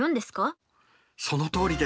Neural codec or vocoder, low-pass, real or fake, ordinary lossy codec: none; none; real; none